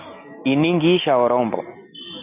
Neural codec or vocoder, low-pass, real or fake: none; 3.6 kHz; real